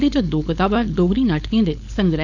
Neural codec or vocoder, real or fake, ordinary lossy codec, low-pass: codec, 16 kHz, 4.8 kbps, FACodec; fake; none; 7.2 kHz